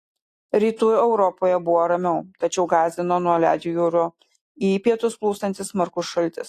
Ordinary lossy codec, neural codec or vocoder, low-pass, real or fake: AAC, 48 kbps; none; 14.4 kHz; real